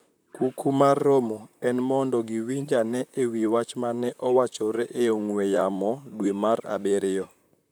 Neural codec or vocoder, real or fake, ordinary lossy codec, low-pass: vocoder, 44.1 kHz, 128 mel bands, Pupu-Vocoder; fake; none; none